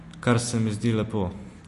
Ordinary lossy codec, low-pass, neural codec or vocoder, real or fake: MP3, 48 kbps; 14.4 kHz; none; real